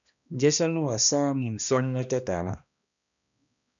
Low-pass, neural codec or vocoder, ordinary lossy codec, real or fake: 7.2 kHz; codec, 16 kHz, 1 kbps, X-Codec, HuBERT features, trained on balanced general audio; MP3, 96 kbps; fake